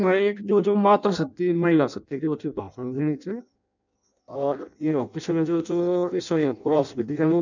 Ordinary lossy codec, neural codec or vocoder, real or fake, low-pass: none; codec, 16 kHz in and 24 kHz out, 0.6 kbps, FireRedTTS-2 codec; fake; 7.2 kHz